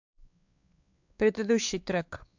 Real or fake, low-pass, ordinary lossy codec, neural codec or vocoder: fake; 7.2 kHz; AAC, 48 kbps; codec, 16 kHz, 4 kbps, X-Codec, WavLM features, trained on Multilingual LibriSpeech